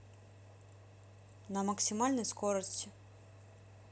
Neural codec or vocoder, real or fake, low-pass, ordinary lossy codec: none; real; none; none